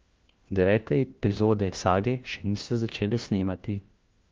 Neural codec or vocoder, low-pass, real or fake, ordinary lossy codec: codec, 16 kHz, 1 kbps, FunCodec, trained on LibriTTS, 50 frames a second; 7.2 kHz; fake; Opus, 32 kbps